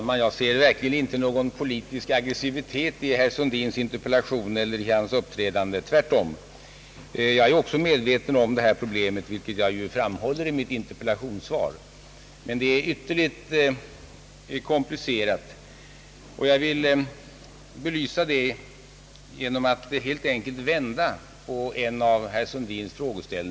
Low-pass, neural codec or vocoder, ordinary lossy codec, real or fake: none; none; none; real